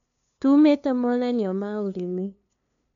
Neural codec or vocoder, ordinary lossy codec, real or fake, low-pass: codec, 16 kHz, 2 kbps, FunCodec, trained on LibriTTS, 25 frames a second; none; fake; 7.2 kHz